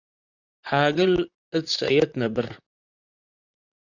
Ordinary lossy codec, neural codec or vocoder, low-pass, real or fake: Opus, 64 kbps; vocoder, 44.1 kHz, 128 mel bands, Pupu-Vocoder; 7.2 kHz; fake